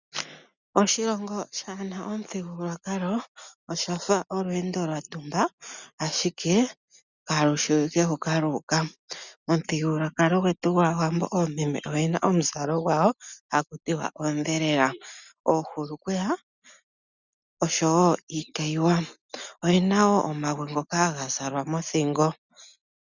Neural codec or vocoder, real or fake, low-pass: none; real; 7.2 kHz